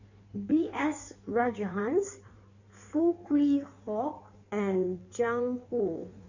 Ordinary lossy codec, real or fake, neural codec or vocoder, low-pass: none; fake; codec, 16 kHz in and 24 kHz out, 1.1 kbps, FireRedTTS-2 codec; 7.2 kHz